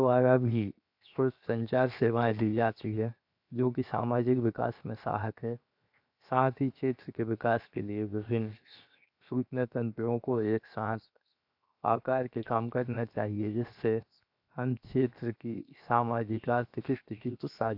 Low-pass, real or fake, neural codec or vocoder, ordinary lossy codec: 5.4 kHz; fake; codec, 16 kHz, 0.7 kbps, FocalCodec; AAC, 48 kbps